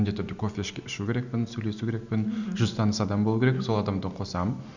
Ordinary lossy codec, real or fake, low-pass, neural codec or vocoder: none; real; 7.2 kHz; none